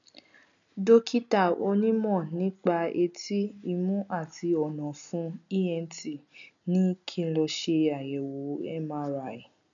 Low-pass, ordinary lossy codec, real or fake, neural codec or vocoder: 7.2 kHz; none; real; none